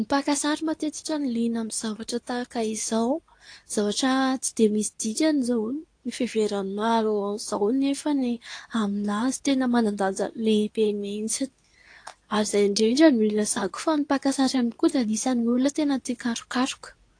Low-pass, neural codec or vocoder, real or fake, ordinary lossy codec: 9.9 kHz; codec, 24 kHz, 0.9 kbps, WavTokenizer, medium speech release version 2; fake; AAC, 48 kbps